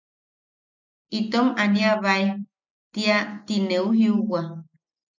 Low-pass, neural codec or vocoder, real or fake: 7.2 kHz; none; real